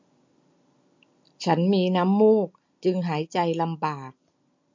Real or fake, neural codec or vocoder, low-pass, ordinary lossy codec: real; none; 7.2 kHz; MP3, 48 kbps